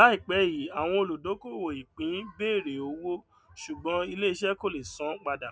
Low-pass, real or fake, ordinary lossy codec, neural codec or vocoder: none; real; none; none